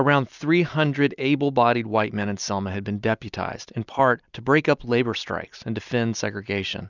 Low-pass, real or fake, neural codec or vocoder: 7.2 kHz; real; none